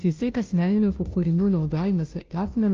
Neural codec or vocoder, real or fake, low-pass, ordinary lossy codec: codec, 16 kHz, 0.5 kbps, FunCodec, trained on Chinese and English, 25 frames a second; fake; 7.2 kHz; Opus, 24 kbps